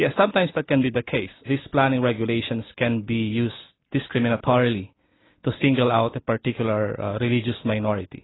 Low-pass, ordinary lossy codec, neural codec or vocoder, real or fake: 7.2 kHz; AAC, 16 kbps; none; real